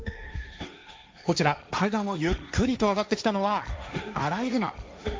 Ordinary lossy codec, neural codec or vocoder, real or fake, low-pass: none; codec, 16 kHz, 1.1 kbps, Voila-Tokenizer; fake; none